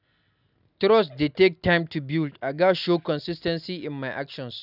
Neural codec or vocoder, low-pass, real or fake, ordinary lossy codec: none; 5.4 kHz; real; none